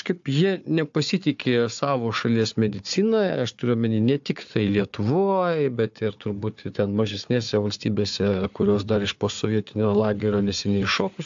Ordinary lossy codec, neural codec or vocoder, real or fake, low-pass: AAC, 64 kbps; codec, 16 kHz, 4 kbps, FunCodec, trained on Chinese and English, 50 frames a second; fake; 7.2 kHz